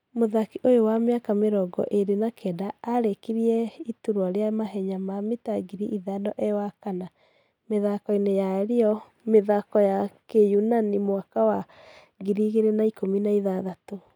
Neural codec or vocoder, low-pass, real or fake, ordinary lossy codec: none; 19.8 kHz; real; none